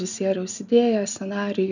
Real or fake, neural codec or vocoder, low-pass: real; none; 7.2 kHz